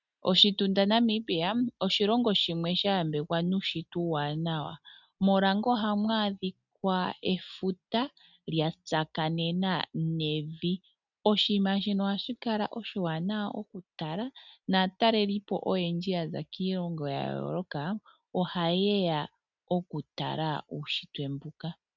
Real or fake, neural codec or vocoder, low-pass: real; none; 7.2 kHz